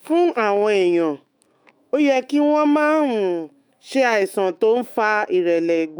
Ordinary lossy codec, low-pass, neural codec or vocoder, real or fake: none; none; autoencoder, 48 kHz, 128 numbers a frame, DAC-VAE, trained on Japanese speech; fake